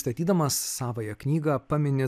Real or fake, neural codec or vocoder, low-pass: real; none; 14.4 kHz